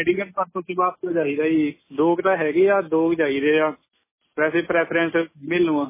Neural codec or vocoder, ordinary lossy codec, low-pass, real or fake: none; MP3, 16 kbps; 3.6 kHz; real